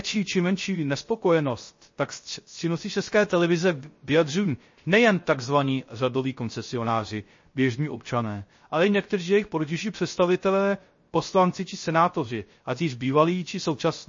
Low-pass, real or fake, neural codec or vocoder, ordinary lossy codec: 7.2 kHz; fake; codec, 16 kHz, 0.3 kbps, FocalCodec; MP3, 32 kbps